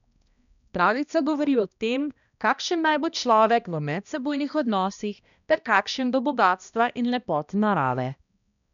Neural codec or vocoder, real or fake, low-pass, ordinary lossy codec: codec, 16 kHz, 1 kbps, X-Codec, HuBERT features, trained on balanced general audio; fake; 7.2 kHz; none